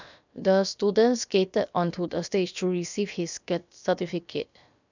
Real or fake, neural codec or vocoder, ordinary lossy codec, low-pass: fake; codec, 16 kHz, about 1 kbps, DyCAST, with the encoder's durations; none; 7.2 kHz